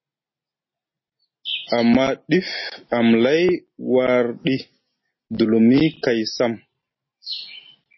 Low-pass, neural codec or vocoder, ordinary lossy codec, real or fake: 7.2 kHz; none; MP3, 24 kbps; real